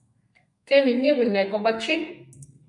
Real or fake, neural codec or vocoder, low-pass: fake; codec, 32 kHz, 1.9 kbps, SNAC; 10.8 kHz